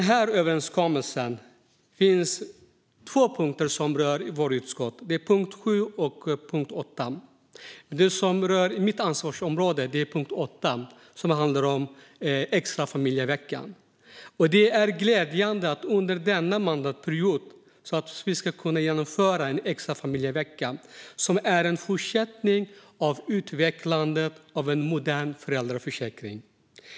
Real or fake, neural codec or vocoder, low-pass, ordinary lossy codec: real; none; none; none